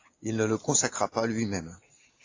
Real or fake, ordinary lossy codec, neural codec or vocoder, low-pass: real; AAC, 32 kbps; none; 7.2 kHz